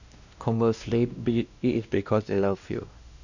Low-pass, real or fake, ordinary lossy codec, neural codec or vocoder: 7.2 kHz; fake; none; codec, 16 kHz, 1 kbps, X-Codec, WavLM features, trained on Multilingual LibriSpeech